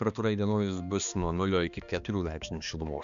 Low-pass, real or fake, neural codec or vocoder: 7.2 kHz; fake; codec, 16 kHz, 2 kbps, X-Codec, HuBERT features, trained on balanced general audio